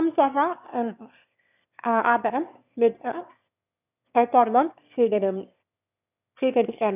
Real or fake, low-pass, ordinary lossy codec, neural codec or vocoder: fake; 3.6 kHz; none; autoencoder, 22.05 kHz, a latent of 192 numbers a frame, VITS, trained on one speaker